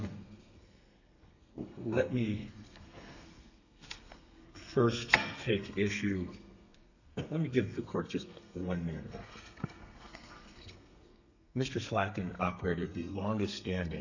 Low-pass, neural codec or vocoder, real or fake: 7.2 kHz; codec, 32 kHz, 1.9 kbps, SNAC; fake